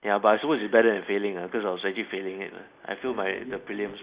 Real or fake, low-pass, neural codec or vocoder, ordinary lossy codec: real; 3.6 kHz; none; Opus, 64 kbps